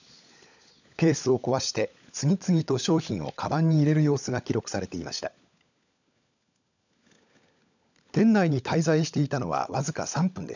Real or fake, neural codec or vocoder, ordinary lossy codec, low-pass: fake; codec, 16 kHz, 16 kbps, FunCodec, trained on LibriTTS, 50 frames a second; none; 7.2 kHz